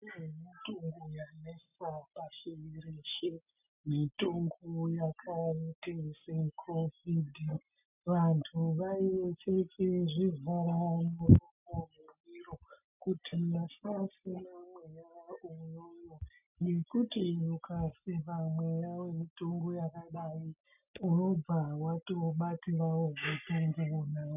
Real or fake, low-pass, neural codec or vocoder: real; 3.6 kHz; none